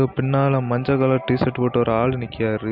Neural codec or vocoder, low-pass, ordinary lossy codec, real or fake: none; 5.4 kHz; none; real